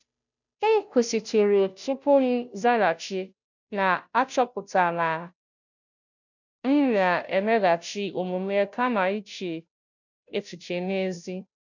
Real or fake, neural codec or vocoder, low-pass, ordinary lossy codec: fake; codec, 16 kHz, 0.5 kbps, FunCodec, trained on Chinese and English, 25 frames a second; 7.2 kHz; none